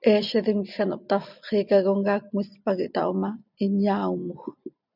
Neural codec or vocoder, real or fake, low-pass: none; real; 5.4 kHz